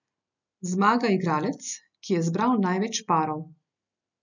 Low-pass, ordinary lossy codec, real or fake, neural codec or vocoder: 7.2 kHz; none; real; none